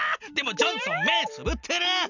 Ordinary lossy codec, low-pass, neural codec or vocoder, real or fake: none; 7.2 kHz; none; real